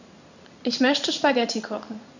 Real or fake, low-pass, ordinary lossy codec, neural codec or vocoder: fake; 7.2 kHz; none; codec, 16 kHz in and 24 kHz out, 1 kbps, XY-Tokenizer